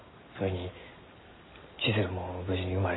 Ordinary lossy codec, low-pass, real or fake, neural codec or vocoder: AAC, 16 kbps; 7.2 kHz; real; none